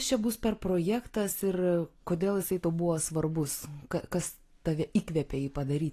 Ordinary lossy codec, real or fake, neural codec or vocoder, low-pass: AAC, 48 kbps; real; none; 14.4 kHz